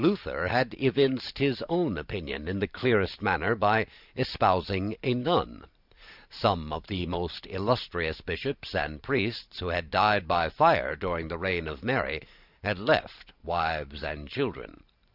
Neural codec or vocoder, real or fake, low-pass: vocoder, 44.1 kHz, 128 mel bands every 512 samples, BigVGAN v2; fake; 5.4 kHz